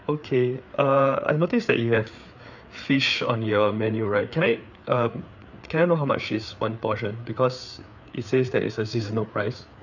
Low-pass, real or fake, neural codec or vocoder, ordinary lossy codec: 7.2 kHz; fake; codec, 16 kHz, 4 kbps, FreqCodec, larger model; none